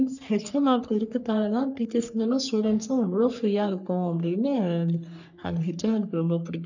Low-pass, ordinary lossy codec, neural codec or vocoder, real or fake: 7.2 kHz; none; codec, 44.1 kHz, 3.4 kbps, Pupu-Codec; fake